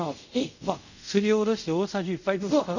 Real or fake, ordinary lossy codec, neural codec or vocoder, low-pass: fake; none; codec, 24 kHz, 0.5 kbps, DualCodec; 7.2 kHz